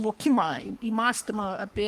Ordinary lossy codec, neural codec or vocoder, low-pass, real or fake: Opus, 24 kbps; codec, 44.1 kHz, 3.4 kbps, Pupu-Codec; 14.4 kHz; fake